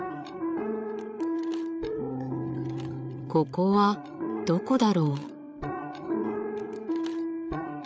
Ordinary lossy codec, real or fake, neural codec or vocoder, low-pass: none; fake; codec, 16 kHz, 8 kbps, FreqCodec, larger model; none